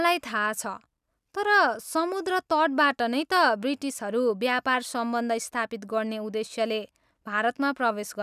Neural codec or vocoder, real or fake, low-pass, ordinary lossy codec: none; real; 14.4 kHz; none